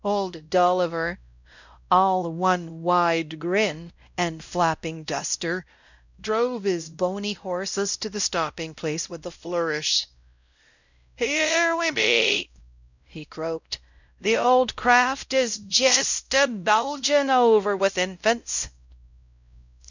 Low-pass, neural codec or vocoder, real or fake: 7.2 kHz; codec, 16 kHz, 0.5 kbps, X-Codec, WavLM features, trained on Multilingual LibriSpeech; fake